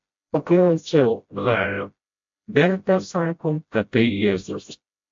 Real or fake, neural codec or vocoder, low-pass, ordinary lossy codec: fake; codec, 16 kHz, 0.5 kbps, FreqCodec, smaller model; 7.2 kHz; MP3, 48 kbps